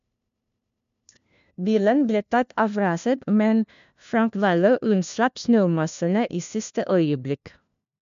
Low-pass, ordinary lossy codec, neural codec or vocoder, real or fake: 7.2 kHz; MP3, 64 kbps; codec, 16 kHz, 1 kbps, FunCodec, trained on LibriTTS, 50 frames a second; fake